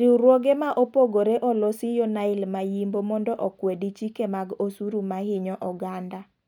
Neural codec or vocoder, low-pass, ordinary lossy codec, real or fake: none; 19.8 kHz; none; real